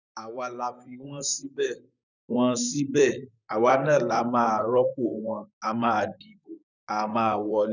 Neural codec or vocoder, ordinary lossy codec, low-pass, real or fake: vocoder, 44.1 kHz, 128 mel bands, Pupu-Vocoder; none; 7.2 kHz; fake